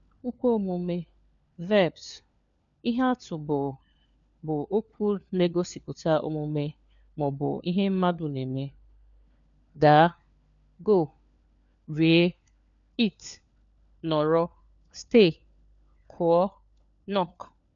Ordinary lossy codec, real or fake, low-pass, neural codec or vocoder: none; fake; 7.2 kHz; codec, 16 kHz, 4 kbps, FunCodec, trained on LibriTTS, 50 frames a second